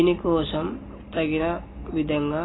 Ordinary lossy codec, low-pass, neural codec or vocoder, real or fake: AAC, 16 kbps; 7.2 kHz; none; real